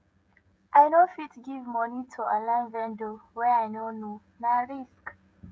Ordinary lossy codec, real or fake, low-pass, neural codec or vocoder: none; fake; none; codec, 16 kHz, 16 kbps, FreqCodec, smaller model